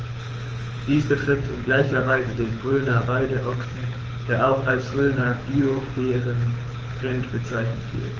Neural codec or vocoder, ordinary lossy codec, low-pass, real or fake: codec, 24 kHz, 6 kbps, HILCodec; Opus, 24 kbps; 7.2 kHz; fake